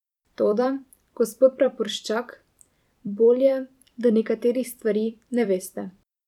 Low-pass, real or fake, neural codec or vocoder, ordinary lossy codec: 19.8 kHz; fake; vocoder, 44.1 kHz, 128 mel bands every 256 samples, BigVGAN v2; none